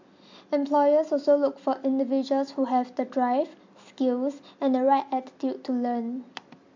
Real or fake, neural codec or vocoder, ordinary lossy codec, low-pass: real; none; MP3, 48 kbps; 7.2 kHz